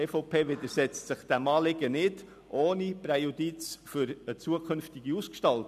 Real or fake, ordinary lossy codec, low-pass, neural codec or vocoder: real; none; 14.4 kHz; none